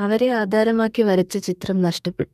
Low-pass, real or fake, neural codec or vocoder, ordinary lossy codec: 14.4 kHz; fake; codec, 32 kHz, 1.9 kbps, SNAC; none